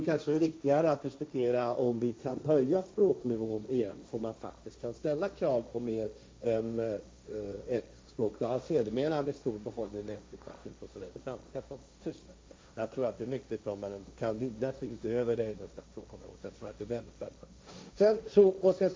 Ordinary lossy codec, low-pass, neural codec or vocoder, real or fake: none; none; codec, 16 kHz, 1.1 kbps, Voila-Tokenizer; fake